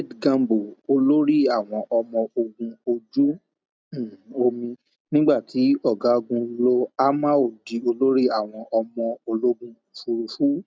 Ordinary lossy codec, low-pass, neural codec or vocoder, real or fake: none; none; none; real